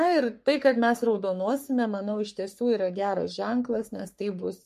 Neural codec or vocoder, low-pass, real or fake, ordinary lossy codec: codec, 44.1 kHz, 3.4 kbps, Pupu-Codec; 14.4 kHz; fake; MP3, 64 kbps